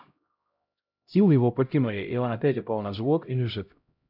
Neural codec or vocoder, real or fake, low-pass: codec, 16 kHz, 0.5 kbps, X-Codec, HuBERT features, trained on LibriSpeech; fake; 5.4 kHz